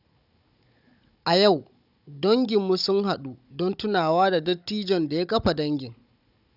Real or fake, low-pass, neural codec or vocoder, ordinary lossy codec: fake; 5.4 kHz; codec, 16 kHz, 16 kbps, FunCodec, trained on Chinese and English, 50 frames a second; none